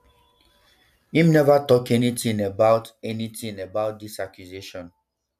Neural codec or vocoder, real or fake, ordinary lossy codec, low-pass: none; real; none; 14.4 kHz